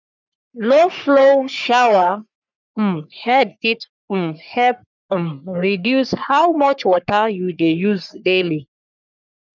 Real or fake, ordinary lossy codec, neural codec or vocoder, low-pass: fake; none; codec, 44.1 kHz, 3.4 kbps, Pupu-Codec; 7.2 kHz